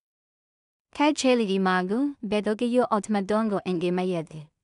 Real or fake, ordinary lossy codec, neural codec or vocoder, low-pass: fake; none; codec, 16 kHz in and 24 kHz out, 0.4 kbps, LongCat-Audio-Codec, two codebook decoder; 10.8 kHz